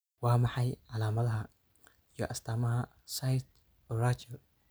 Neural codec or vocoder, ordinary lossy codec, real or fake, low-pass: none; none; real; none